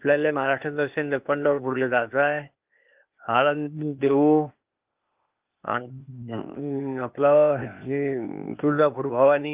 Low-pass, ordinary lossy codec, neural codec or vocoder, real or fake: 3.6 kHz; Opus, 24 kbps; codec, 16 kHz, 0.8 kbps, ZipCodec; fake